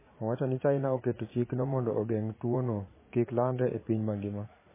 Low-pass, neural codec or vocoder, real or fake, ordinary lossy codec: 3.6 kHz; vocoder, 44.1 kHz, 80 mel bands, Vocos; fake; MP3, 16 kbps